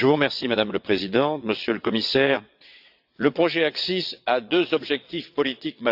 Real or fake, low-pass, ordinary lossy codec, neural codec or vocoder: fake; 5.4 kHz; AAC, 48 kbps; vocoder, 22.05 kHz, 80 mel bands, WaveNeXt